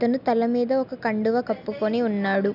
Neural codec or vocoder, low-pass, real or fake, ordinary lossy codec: none; 5.4 kHz; real; none